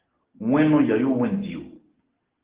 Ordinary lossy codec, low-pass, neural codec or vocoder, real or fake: Opus, 16 kbps; 3.6 kHz; none; real